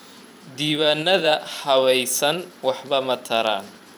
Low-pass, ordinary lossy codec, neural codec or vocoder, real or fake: 19.8 kHz; none; vocoder, 44.1 kHz, 128 mel bands every 512 samples, BigVGAN v2; fake